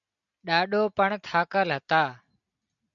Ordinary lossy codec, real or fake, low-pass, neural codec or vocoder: MP3, 96 kbps; real; 7.2 kHz; none